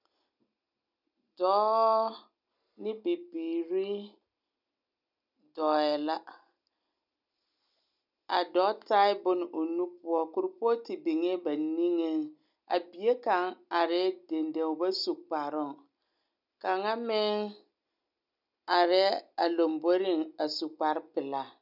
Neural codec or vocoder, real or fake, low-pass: none; real; 5.4 kHz